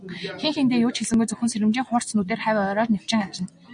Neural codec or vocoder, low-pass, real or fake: none; 9.9 kHz; real